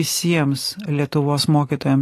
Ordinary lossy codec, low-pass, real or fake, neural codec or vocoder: AAC, 48 kbps; 14.4 kHz; real; none